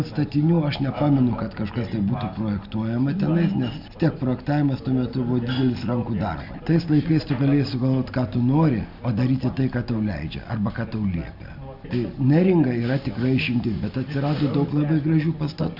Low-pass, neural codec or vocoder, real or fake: 5.4 kHz; none; real